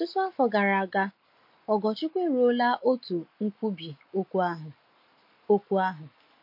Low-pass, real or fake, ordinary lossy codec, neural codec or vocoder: 5.4 kHz; real; MP3, 32 kbps; none